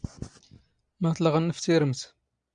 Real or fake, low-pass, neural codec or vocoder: real; 9.9 kHz; none